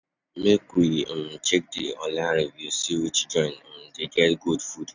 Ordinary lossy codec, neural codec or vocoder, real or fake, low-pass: none; none; real; 7.2 kHz